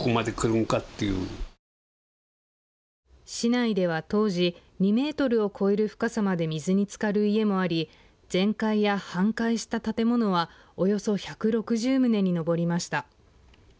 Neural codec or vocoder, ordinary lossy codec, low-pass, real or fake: none; none; none; real